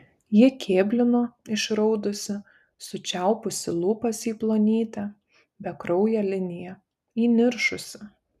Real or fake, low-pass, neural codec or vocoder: real; 14.4 kHz; none